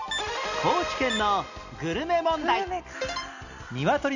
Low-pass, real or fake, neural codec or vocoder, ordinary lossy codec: 7.2 kHz; real; none; none